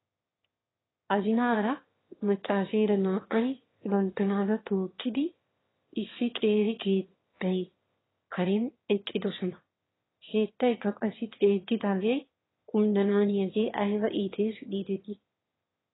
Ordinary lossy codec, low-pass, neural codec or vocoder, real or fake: AAC, 16 kbps; 7.2 kHz; autoencoder, 22.05 kHz, a latent of 192 numbers a frame, VITS, trained on one speaker; fake